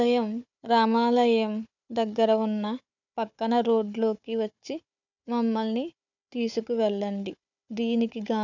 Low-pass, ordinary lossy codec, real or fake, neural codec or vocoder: 7.2 kHz; none; fake; codec, 16 kHz, 4 kbps, FunCodec, trained on Chinese and English, 50 frames a second